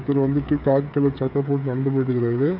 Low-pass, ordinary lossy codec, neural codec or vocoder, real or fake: 5.4 kHz; none; codec, 16 kHz, 16 kbps, FreqCodec, smaller model; fake